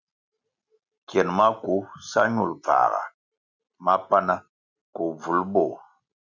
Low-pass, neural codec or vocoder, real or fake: 7.2 kHz; none; real